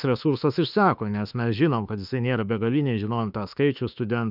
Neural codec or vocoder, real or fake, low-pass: autoencoder, 48 kHz, 32 numbers a frame, DAC-VAE, trained on Japanese speech; fake; 5.4 kHz